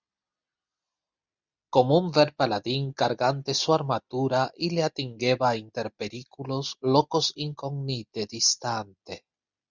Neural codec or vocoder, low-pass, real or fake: none; 7.2 kHz; real